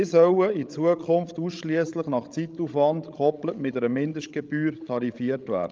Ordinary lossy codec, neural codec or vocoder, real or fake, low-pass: Opus, 32 kbps; codec, 16 kHz, 16 kbps, FreqCodec, larger model; fake; 7.2 kHz